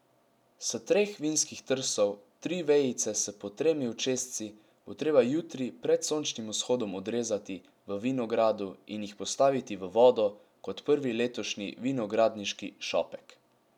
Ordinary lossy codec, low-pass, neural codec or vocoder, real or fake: none; 19.8 kHz; none; real